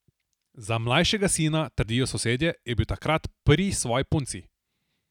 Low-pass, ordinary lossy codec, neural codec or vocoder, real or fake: 19.8 kHz; none; none; real